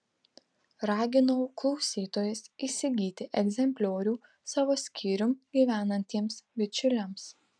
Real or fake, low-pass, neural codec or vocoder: real; 9.9 kHz; none